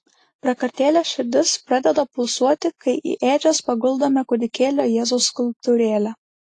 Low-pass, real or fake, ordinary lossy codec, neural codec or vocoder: 10.8 kHz; real; AAC, 48 kbps; none